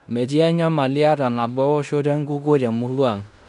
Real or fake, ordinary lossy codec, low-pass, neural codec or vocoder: fake; none; 10.8 kHz; codec, 16 kHz in and 24 kHz out, 0.9 kbps, LongCat-Audio-Codec, fine tuned four codebook decoder